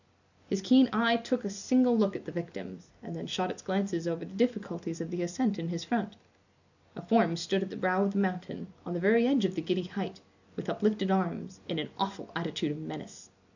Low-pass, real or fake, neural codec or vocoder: 7.2 kHz; real; none